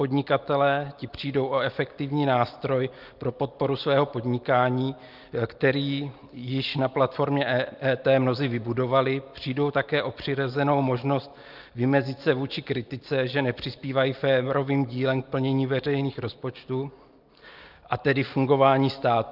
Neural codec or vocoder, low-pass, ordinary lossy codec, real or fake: none; 5.4 kHz; Opus, 32 kbps; real